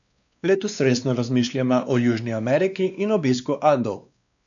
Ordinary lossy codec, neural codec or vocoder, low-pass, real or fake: none; codec, 16 kHz, 2 kbps, X-Codec, WavLM features, trained on Multilingual LibriSpeech; 7.2 kHz; fake